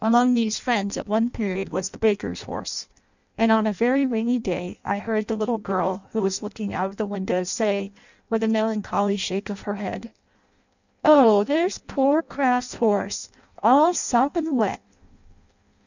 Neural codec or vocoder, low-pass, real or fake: codec, 16 kHz in and 24 kHz out, 0.6 kbps, FireRedTTS-2 codec; 7.2 kHz; fake